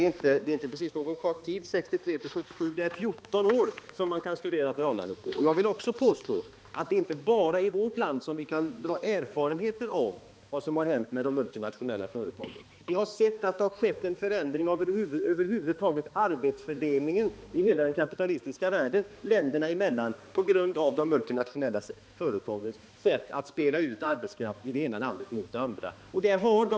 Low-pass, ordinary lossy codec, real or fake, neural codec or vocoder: none; none; fake; codec, 16 kHz, 2 kbps, X-Codec, HuBERT features, trained on balanced general audio